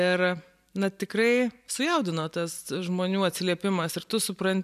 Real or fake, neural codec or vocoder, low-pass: fake; vocoder, 44.1 kHz, 128 mel bands every 512 samples, BigVGAN v2; 14.4 kHz